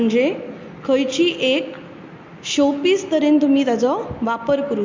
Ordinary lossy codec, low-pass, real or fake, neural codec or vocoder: MP3, 48 kbps; 7.2 kHz; real; none